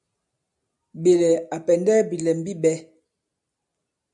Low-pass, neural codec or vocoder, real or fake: 10.8 kHz; none; real